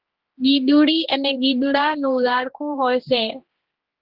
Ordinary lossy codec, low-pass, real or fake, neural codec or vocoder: Opus, 16 kbps; 5.4 kHz; fake; codec, 16 kHz, 2 kbps, X-Codec, HuBERT features, trained on general audio